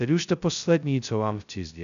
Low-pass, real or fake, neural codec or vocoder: 7.2 kHz; fake; codec, 16 kHz, 0.2 kbps, FocalCodec